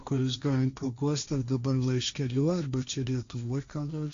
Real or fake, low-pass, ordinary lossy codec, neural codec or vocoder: fake; 7.2 kHz; Opus, 64 kbps; codec, 16 kHz, 1.1 kbps, Voila-Tokenizer